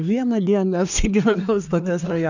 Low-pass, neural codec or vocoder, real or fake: 7.2 kHz; codec, 24 kHz, 1 kbps, SNAC; fake